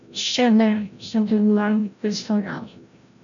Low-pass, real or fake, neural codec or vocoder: 7.2 kHz; fake; codec, 16 kHz, 0.5 kbps, FreqCodec, larger model